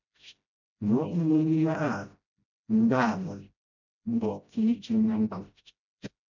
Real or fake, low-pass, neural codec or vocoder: fake; 7.2 kHz; codec, 16 kHz, 0.5 kbps, FreqCodec, smaller model